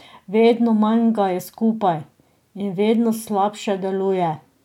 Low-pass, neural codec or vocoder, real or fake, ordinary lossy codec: 19.8 kHz; none; real; none